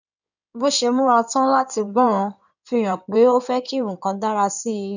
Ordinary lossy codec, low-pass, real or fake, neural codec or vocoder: none; 7.2 kHz; fake; codec, 16 kHz in and 24 kHz out, 2.2 kbps, FireRedTTS-2 codec